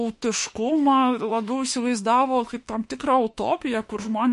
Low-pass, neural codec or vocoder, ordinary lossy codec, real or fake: 14.4 kHz; autoencoder, 48 kHz, 32 numbers a frame, DAC-VAE, trained on Japanese speech; MP3, 48 kbps; fake